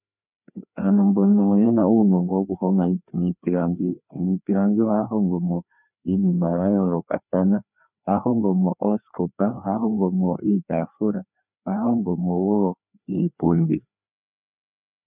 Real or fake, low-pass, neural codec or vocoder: fake; 3.6 kHz; codec, 16 kHz, 2 kbps, FreqCodec, larger model